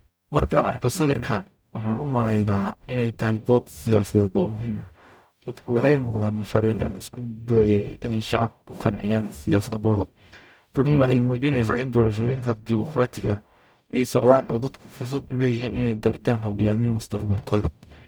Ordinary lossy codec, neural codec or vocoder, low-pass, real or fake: none; codec, 44.1 kHz, 0.9 kbps, DAC; none; fake